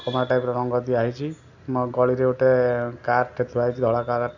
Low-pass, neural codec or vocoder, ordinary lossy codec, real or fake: 7.2 kHz; none; none; real